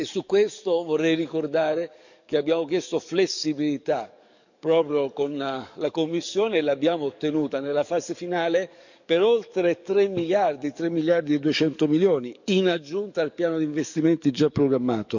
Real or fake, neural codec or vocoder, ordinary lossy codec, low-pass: fake; codec, 44.1 kHz, 7.8 kbps, DAC; none; 7.2 kHz